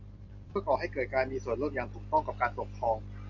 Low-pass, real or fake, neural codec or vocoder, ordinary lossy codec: 7.2 kHz; real; none; AAC, 48 kbps